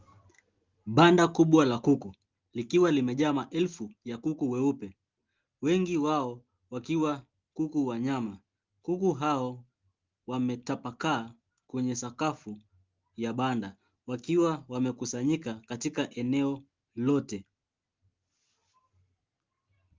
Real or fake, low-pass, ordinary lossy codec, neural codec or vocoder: real; 7.2 kHz; Opus, 32 kbps; none